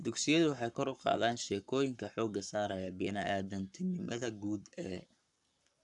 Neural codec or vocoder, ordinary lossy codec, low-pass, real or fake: codec, 44.1 kHz, 7.8 kbps, Pupu-Codec; none; 10.8 kHz; fake